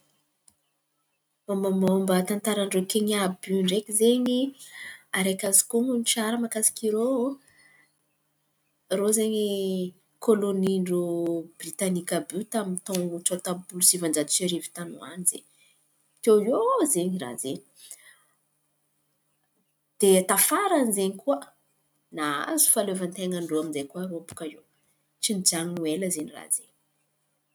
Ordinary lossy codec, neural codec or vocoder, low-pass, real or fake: none; none; none; real